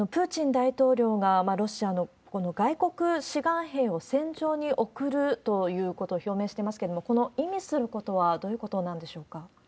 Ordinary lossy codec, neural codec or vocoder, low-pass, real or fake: none; none; none; real